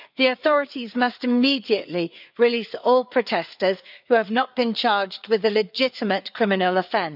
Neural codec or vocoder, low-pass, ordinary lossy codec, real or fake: codec, 16 kHz, 4 kbps, FreqCodec, larger model; 5.4 kHz; none; fake